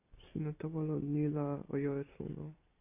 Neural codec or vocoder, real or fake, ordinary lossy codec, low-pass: vocoder, 44.1 kHz, 128 mel bands every 256 samples, BigVGAN v2; fake; AAC, 32 kbps; 3.6 kHz